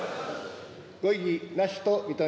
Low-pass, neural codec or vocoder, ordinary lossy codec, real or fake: none; none; none; real